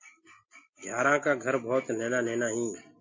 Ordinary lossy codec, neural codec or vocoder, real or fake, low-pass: MP3, 32 kbps; none; real; 7.2 kHz